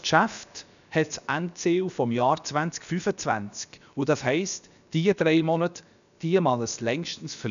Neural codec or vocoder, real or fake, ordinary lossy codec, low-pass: codec, 16 kHz, about 1 kbps, DyCAST, with the encoder's durations; fake; none; 7.2 kHz